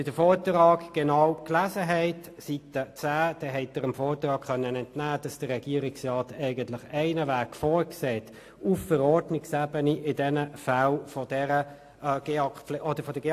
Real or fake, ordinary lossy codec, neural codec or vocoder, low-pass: real; AAC, 64 kbps; none; 14.4 kHz